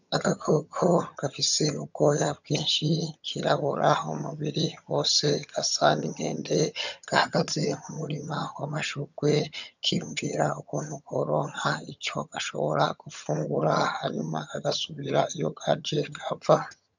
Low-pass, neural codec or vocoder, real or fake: 7.2 kHz; vocoder, 22.05 kHz, 80 mel bands, HiFi-GAN; fake